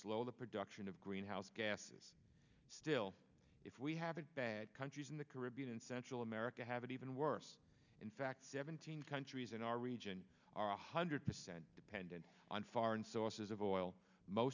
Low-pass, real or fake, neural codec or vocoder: 7.2 kHz; real; none